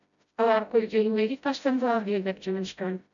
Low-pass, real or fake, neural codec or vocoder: 7.2 kHz; fake; codec, 16 kHz, 0.5 kbps, FreqCodec, smaller model